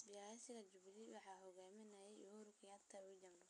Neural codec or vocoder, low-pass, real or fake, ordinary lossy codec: none; 10.8 kHz; real; none